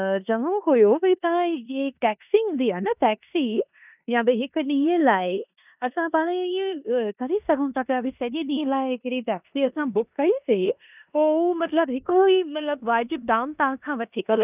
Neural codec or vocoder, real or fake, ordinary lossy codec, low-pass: codec, 16 kHz in and 24 kHz out, 0.9 kbps, LongCat-Audio-Codec, four codebook decoder; fake; none; 3.6 kHz